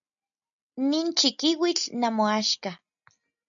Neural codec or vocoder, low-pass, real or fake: none; 7.2 kHz; real